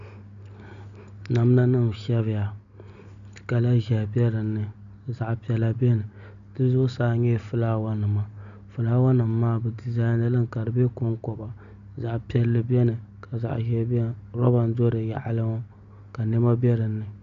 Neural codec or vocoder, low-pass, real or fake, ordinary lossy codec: none; 7.2 kHz; real; AAC, 96 kbps